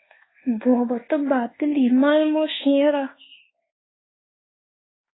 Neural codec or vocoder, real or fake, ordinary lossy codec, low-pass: codec, 24 kHz, 1.2 kbps, DualCodec; fake; AAC, 16 kbps; 7.2 kHz